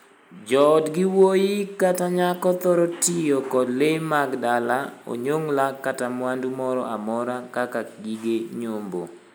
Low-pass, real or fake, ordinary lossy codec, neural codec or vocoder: none; real; none; none